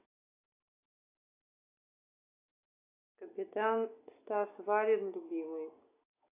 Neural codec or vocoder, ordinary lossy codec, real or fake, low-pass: none; AAC, 24 kbps; real; 3.6 kHz